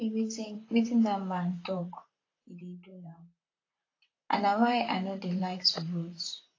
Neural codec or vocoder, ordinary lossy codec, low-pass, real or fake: vocoder, 44.1 kHz, 128 mel bands, Pupu-Vocoder; AAC, 32 kbps; 7.2 kHz; fake